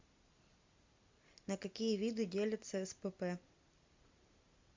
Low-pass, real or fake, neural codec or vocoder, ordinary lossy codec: 7.2 kHz; real; none; MP3, 64 kbps